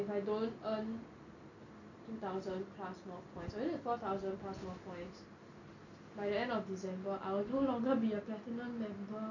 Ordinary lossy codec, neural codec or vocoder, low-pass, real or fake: none; none; 7.2 kHz; real